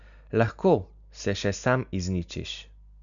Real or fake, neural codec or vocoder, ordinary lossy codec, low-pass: real; none; none; 7.2 kHz